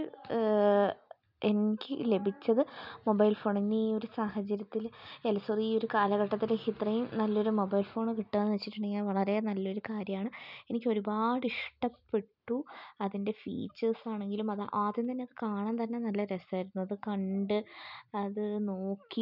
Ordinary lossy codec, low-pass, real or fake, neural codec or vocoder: none; 5.4 kHz; real; none